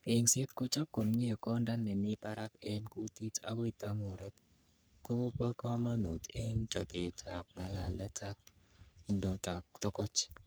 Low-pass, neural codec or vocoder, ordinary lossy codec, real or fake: none; codec, 44.1 kHz, 3.4 kbps, Pupu-Codec; none; fake